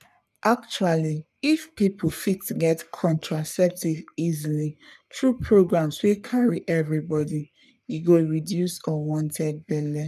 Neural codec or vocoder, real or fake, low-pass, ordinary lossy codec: codec, 44.1 kHz, 3.4 kbps, Pupu-Codec; fake; 14.4 kHz; none